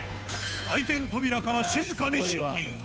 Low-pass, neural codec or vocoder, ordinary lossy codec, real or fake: none; codec, 16 kHz, 2 kbps, FunCodec, trained on Chinese and English, 25 frames a second; none; fake